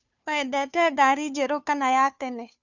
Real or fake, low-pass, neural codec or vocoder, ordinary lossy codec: fake; 7.2 kHz; codec, 16 kHz, 2 kbps, FunCodec, trained on Chinese and English, 25 frames a second; none